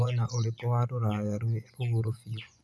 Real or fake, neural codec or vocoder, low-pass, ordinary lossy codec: fake; vocoder, 24 kHz, 100 mel bands, Vocos; none; none